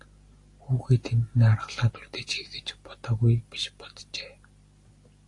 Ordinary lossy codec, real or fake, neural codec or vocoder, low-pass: AAC, 48 kbps; real; none; 10.8 kHz